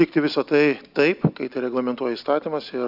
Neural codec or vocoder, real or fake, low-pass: none; real; 5.4 kHz